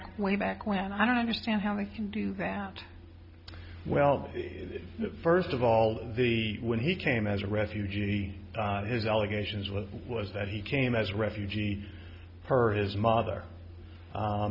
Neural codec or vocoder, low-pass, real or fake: none; 5.4 kHz; real